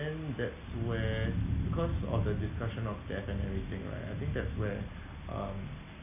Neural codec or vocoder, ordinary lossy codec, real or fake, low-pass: none; none; real; 3.6 kHz